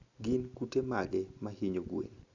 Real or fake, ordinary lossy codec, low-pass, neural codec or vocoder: real; Opus, 64 kbps; 7.2 kHz; none